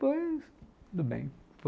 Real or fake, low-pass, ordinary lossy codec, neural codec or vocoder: real; none; none; none